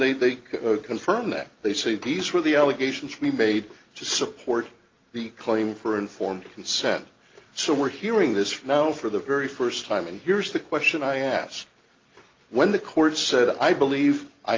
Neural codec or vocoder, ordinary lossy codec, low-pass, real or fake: none; Opus, 32 kbps; 7.2 kHz; real